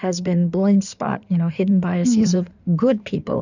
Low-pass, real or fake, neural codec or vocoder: 7.2 kHz; fake; codec, 16 kHz in and 24 kHz out, 2.2 kbps, FireRedTTS-2 codec